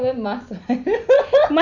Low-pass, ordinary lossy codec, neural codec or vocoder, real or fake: 7.2 kHz; none; none; real